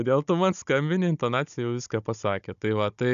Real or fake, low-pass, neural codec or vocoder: fake; 7.2 kHz; codec, 16 kHz, 16 kbps, FunCodec, trained on Chinese and English, 50 frames a second